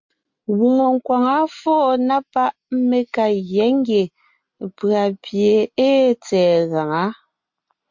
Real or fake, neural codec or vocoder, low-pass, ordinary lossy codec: real; none; 7.2 kHz; MP3, 48 kbps